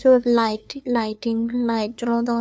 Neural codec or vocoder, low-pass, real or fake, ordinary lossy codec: codec, 16 kHz, 2 kbps, FunCodec, trained on LibriTTS, 25 frames a second; none; fake; none